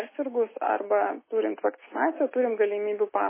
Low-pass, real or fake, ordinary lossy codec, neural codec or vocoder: 3.6 kHz; real; MP3, 16 kbps; none